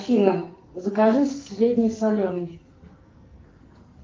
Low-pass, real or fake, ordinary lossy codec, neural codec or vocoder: 7.2 kHz; fake; Opus, 32 kbps; codec, 44.1 kHz, 2.6 kbps, SNAC